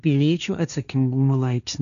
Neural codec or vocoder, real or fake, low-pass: codec, 16 kHz, 1.1 kbps, Voila-Tokenizer; fake; 7.2 kHz